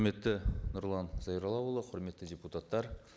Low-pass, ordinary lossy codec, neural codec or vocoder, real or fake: none; none; none; real